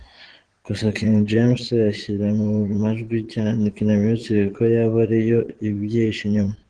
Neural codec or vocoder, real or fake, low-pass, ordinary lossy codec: vocoder, 22.05 kHz, 80 mel bands, Vocos; fake; 9.9 kHz; Opus, 16 kbps